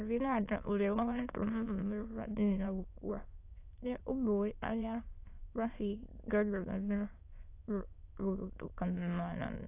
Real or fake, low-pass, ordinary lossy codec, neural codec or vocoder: fake; 3.6 kHz; AAC, 24 kbps; autoencoder, 22.05 kHz, a latent of 192 numbers a frame, VITS, trained on many speakers